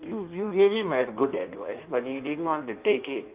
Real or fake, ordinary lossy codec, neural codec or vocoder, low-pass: fake; Opus, 32 kbps; codec, 16 kHz in and 24 kHz out, 1.1 kbps, FireRedTTS-2 codec; 3.6 kHz